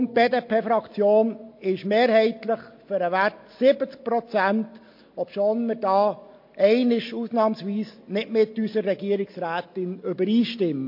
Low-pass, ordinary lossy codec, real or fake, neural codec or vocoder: 5.4 kHz; MP3, 32 kbps; real; none